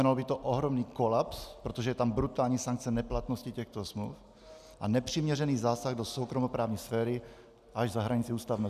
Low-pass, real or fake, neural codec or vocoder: 14.4 kHz; real; none